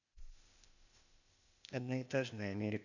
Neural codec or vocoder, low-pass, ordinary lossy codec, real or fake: codec, 16 kHz, 0.8 kbps, ZipCodec; 7.2 kHz; none; fake